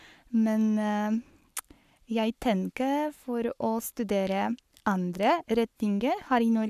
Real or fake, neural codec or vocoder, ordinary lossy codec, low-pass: fake; codec, 44.1 kHz, 7.8 kbps, Pupu-Codec; none; 14.4 kHz